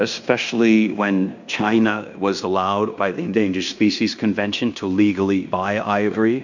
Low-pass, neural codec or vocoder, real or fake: 7.2 kHz; codec, 16 kHz in and 24 kHz out, 0.9 kbps, LongCat-Audio-Codec, fine tuned four codebook decoder; fake